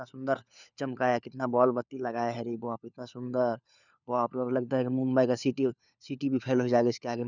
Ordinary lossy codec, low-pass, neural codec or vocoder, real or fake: none; 7.2 kHz; codec, 16 kHz, 4 kbps, FunCodec, trained on LibriTTS, 50 frames a second; fake